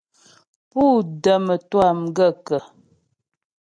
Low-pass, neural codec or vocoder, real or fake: 9.9 kHz; none; real